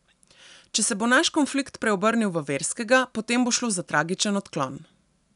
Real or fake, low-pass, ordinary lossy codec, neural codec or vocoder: real; 10.8 kHz; none; none